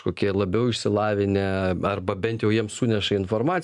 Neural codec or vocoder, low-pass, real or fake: none; 10.8 kHz; real